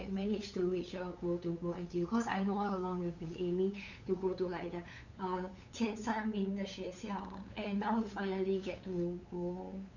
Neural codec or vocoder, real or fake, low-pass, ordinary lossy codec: codec, 16 kHz, 8 kbps, FunCodec, trained on LibriTTS, 25 frames a second; fake; 7.2 kHz; none